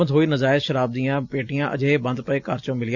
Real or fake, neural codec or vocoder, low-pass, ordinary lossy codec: real; none; 7.2 kHz; none